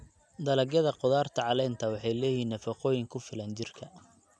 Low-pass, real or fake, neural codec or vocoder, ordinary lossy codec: none; real; none; none